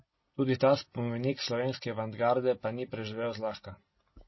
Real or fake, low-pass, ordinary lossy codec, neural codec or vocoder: real; 7.2 kHz; MP3, 24 kbps; none